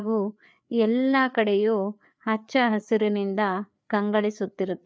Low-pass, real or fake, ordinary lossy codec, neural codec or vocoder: none; fake; none; codec, 16 kHz, 4 kbps, FreqCodec, larger model